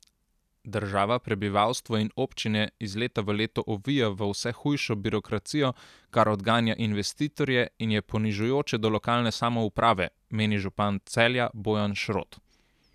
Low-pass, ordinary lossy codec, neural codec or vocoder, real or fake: 14.4 kHz; none; none; real